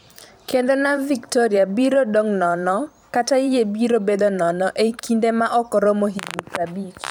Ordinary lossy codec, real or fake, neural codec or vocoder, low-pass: none; fake; vocoder, 44.1 kHz, 128 mel bands every 512 samples, BigVGAN v2; none